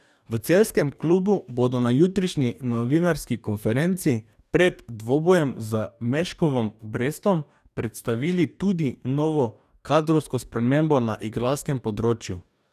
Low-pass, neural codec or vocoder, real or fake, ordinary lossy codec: 14.4 kHz; codec, 44.1 kHz, 2.6 kbps, DAC; fake; none